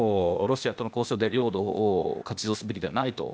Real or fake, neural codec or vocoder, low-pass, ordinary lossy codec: fake; codec, 16 kHz, 0.8 kbps, ZipCodec; none; none